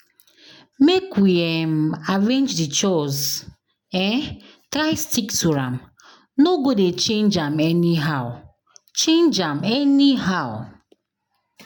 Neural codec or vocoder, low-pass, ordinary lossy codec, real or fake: none; none; none; real